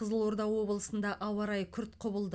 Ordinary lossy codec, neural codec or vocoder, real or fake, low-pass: none; none; real; none